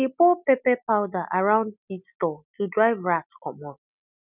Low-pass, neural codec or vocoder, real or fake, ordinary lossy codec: 3.6 kHz; none; real; none